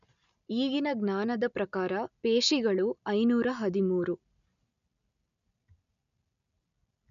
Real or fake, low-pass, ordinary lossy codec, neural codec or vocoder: real; 7.2 kHz; none; none